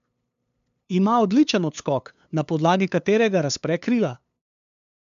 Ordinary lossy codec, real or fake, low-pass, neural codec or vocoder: MP3, 64 kbps; fake; 7.2 kHz; codec, 16 kHz, 2 kbps, FunCodec, trained on LibriTTS, 25 frames a second